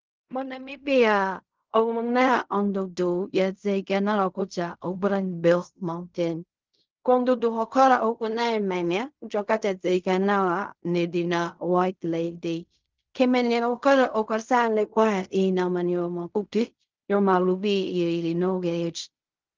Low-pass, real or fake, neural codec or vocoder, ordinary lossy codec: 7.2 kHz; fake; codec, 16 kHz in and 24 kHz out, 0.4 kbps, LongCat-Audio-Codec, fine tuned four codebook decoder; Opus, 32 kbps